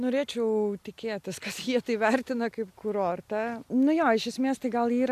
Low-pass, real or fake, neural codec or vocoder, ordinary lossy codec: 14.4 kHz; real; none; MP3, 96 kbps